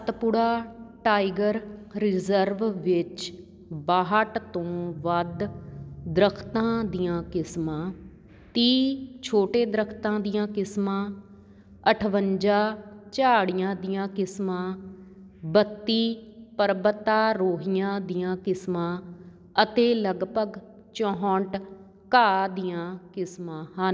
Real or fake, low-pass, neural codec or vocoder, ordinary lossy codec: real; none; none; none